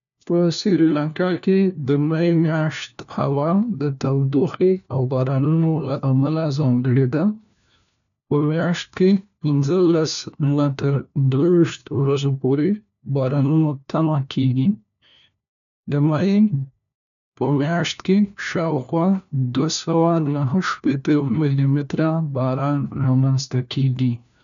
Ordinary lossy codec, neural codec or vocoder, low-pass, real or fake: none; codec, 16 kHz, 1 kbps, FunCodec, trained on LibriTTS, 50 frames a second; 7.2 kHz; fake